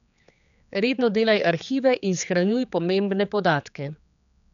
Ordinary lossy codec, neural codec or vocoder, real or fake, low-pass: none; codec, 16 kHz, 4 kbps, X-Codec, HuBERT features, trained on general audio; fake; 7.2 kHz